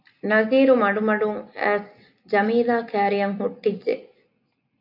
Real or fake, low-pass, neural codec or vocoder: real; 5.4 kHz; none